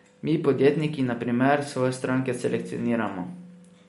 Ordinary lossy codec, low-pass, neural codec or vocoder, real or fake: MP3, 48 kbps; 19.8 kHz; none; real